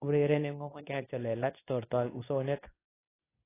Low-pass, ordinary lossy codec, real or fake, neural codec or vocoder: 3.6 kHz; AAC, 16 kbps; fake; codec, 24 kHz, 0.9 kbps, WavTokenizer, medium speech release version 2